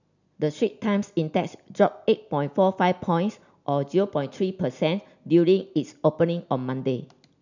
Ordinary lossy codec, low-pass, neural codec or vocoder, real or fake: none; 7.2 kHz; none; real